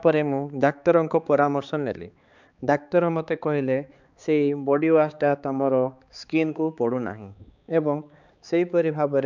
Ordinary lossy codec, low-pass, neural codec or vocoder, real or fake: none; 7.2 kHz; codec, 16 kHz, 4 kbps, X-Codec, HuBERT features, trained on balanced general audio; fake